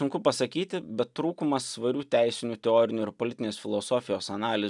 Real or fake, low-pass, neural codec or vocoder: real; 10.8 kHz; none